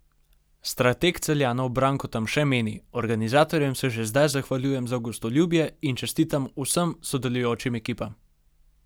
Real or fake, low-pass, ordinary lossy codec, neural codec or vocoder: real; none; none; none